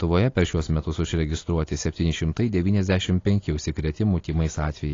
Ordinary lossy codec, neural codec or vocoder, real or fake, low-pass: AAC, 32 kbps; none; real; 7.2 kHz